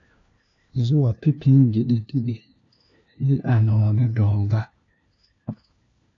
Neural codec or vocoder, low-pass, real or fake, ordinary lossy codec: codec, 16 kHz, 1 kbps, FunCodec, trained on LibriTTS, 50 frames a second; 7.2 kHz; fake; AAC, 48 kbps